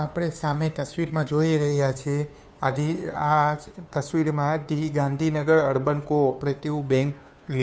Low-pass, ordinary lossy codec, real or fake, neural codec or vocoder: none; none; fake; codec, 16 kHz, 2 kbps, FunCodec, trained on Chinese and English, 25 frames a second